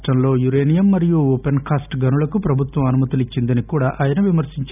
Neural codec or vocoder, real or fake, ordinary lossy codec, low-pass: none; real; none; 3.6 kHz